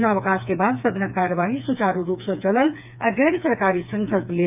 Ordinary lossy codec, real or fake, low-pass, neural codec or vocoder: none; fake; 3.6 kHz; codec, 16 kHz, 4 kbps, FreqCodec, smaller model